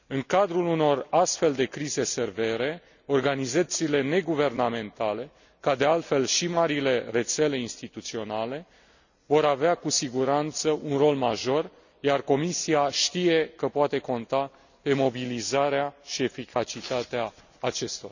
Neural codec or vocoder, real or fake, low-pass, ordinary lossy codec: none; real; 7.2 kHz; none